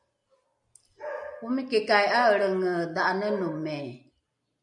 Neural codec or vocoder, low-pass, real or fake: none; 10.8 kHz; real